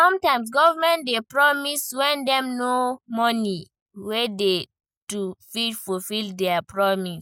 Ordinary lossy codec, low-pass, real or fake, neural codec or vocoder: none; none; real; none